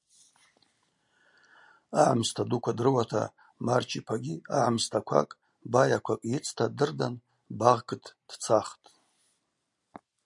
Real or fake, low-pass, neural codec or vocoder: real; 10.8 kHz; none